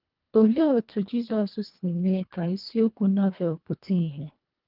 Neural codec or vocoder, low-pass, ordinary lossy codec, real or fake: codec, 24 kHz, 1.5 kbps, HILCodec; 5.4 kHz; Opus, 32 kbps; fake